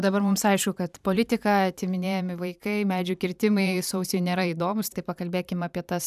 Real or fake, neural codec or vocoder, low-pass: fake; vocoder, 44.1 kHz, 128 mel bands every 512 samples, BigVGAN v2; 14.4 kHz